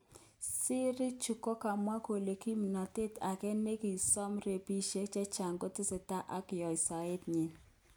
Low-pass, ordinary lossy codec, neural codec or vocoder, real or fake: none; none; none; real